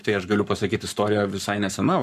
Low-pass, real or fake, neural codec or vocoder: 14.4 kHz; fake; codec, 44.1 kHz, 7.8 kbps, Pupu-Codec